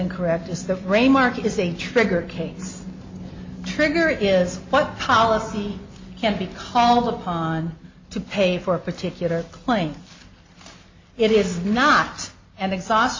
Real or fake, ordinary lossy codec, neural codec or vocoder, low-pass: real; MP3, 32 kbps; none; 7.2 kHz